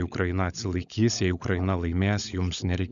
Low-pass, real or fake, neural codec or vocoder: 7.2 kHz; fake; codec, 16 kHz, 16 kbps, FunCodec, trained on LibriTTS, 50 frames a second